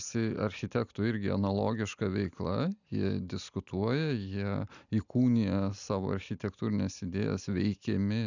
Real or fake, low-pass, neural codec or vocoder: real; 7.2 kHz; none